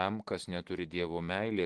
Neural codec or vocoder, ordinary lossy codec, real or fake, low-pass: none; Opus, 16 kbps; real; 10.8 kHz